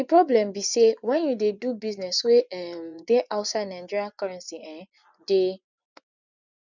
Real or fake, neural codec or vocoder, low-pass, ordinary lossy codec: fake; vocoder, 24 kHz, 100 mel bands, Vocos; 7.2 kHz; none